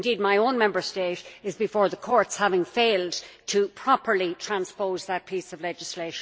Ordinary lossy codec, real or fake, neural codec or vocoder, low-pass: none; real; none; none